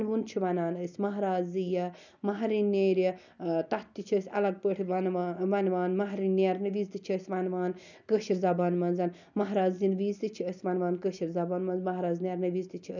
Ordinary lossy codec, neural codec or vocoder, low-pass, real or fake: none; none; 7.2 kHz; real